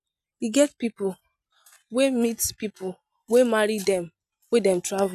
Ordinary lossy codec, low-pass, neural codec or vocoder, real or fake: none; 14.4 kHz; none; real